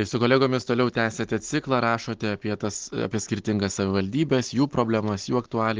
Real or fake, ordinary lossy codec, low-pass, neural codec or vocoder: real; Opus, 16 kbps; 7.2 kHz; none